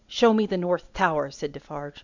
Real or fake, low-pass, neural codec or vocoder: real; 7.2 kHz; none